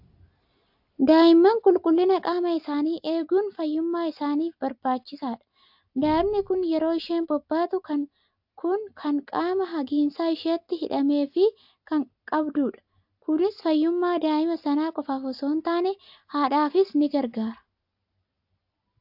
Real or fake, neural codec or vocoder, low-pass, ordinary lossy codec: real; none; 5.4 kHz; AAC, 48 kbps